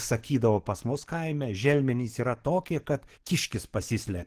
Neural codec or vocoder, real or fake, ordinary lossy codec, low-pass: codec, 44.1 kHz, 7.8 kbps, DAC; fake; Opus, 16 kbps; 14.4 kHz